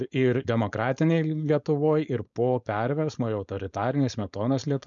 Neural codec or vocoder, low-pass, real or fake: codec, 16 kHz, 4.8 kbps, FACodec; 7.2 kHz; fake